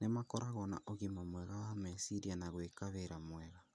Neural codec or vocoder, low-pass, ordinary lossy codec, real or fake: none; none; none; real